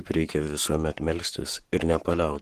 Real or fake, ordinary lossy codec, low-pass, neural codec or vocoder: fake; Opus, 16 kbps; 14.4 kHz; codec, 44.1 kHz, 7.8 kbps, Pupu-Codec